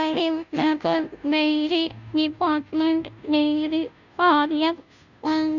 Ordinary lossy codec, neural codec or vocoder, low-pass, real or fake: none; codec, 16 kHz, 0.5 kbps, FunCodec, trained on Chinese and English, 25 frames a second; 7.2 kHz; fake